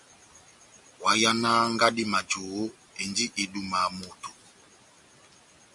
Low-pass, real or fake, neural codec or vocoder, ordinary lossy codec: 10.8 kHz; real; none; MP3, 64 kbps